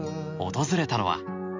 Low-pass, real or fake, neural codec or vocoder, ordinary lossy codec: 7.2 kHz; real; none; MP3, 64 kbps